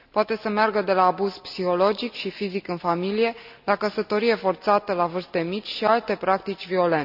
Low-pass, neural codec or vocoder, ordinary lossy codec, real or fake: 5.4 kHz; none; none; real